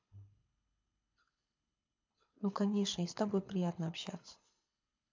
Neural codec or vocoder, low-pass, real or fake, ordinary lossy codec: codec, 24 kHz, 6 kbps, HILCodec; 7.2 kHz; fake; MP3, 64 kbps